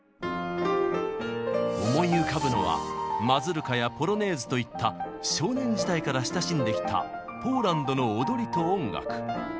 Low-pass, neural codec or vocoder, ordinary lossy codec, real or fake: none; none; none; real